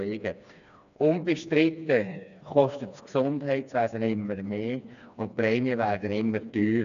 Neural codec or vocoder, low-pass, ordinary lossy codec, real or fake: codec, 16 kHz, 2 kbps, FreqCodec, smaller model; 7.2 kHz; none; fake